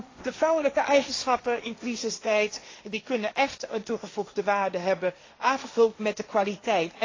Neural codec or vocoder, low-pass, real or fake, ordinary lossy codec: codec, 16 kHz, 1.1 kbps, Voila-Tokenizer; 7.2 kHz; fake; AAC, 32 kbps